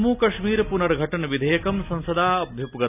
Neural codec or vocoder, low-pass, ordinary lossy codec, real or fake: none; 3.6 kHz; none; real